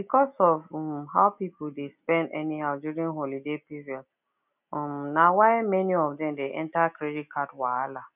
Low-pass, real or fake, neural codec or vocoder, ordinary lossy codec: 3.6 kHz; real; none; none